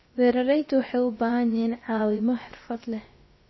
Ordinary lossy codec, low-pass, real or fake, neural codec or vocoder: MP3, 24 kbps; 7.2 kHz; fake; codec, 16 kHz, about 1 kbps, DyCAST, with the encoder's durations